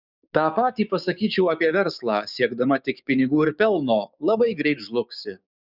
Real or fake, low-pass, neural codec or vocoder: fake; 5.4 kHz; codec, 16 kHz, 6 kbps, DAC